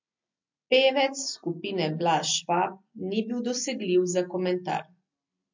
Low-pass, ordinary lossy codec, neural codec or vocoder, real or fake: 7.2 kHz; MP3, 48 kbps; none; real